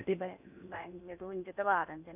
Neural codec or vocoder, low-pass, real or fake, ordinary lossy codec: codec, 16 kHz in and 24 kHz out, 0.8 kbps, FocalCodec, streaming, 65536 codes; 3.6 kHz; fake; none